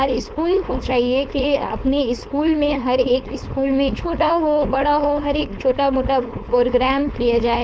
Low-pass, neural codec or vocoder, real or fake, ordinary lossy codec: none; codec, 16 kHz, 4.8 kbps, FACodec; fake; none